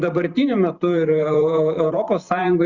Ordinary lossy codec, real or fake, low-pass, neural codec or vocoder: Opus, 64 kbps; fake; 7.2 kHz; vocoder, 44.1 kHz, 128 mel bands every 512 samples, BigVGAN v2